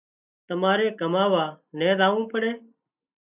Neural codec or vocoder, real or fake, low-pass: none; real; 3.6 kHz